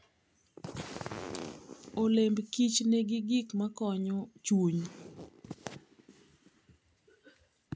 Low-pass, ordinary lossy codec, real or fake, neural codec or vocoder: none; none; real; none